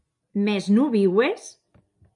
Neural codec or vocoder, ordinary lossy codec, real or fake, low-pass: none; MP3, 64 kbps; real; 10.8 kHz